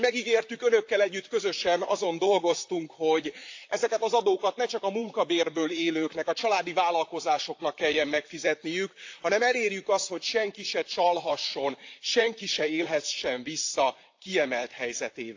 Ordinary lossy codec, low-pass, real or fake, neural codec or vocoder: AAC, 48 kbps; 7.2 kHz; fake; vocoder, 44.1 kHz, 128 mel bands, Pupu-Vocoder